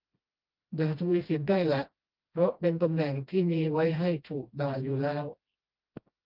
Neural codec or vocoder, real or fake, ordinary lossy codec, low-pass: codec, 16 kHz, 1 kbps, FreqCodec, smaller model; fake; Opus, 24 kbps; 5.4 kHz